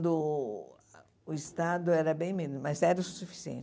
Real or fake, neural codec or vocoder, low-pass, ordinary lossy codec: real; none; none; none